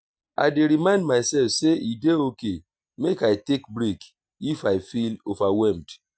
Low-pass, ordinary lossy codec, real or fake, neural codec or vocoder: none; none; real; none